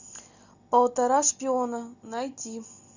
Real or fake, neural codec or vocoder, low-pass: real; none; 7.2 kHz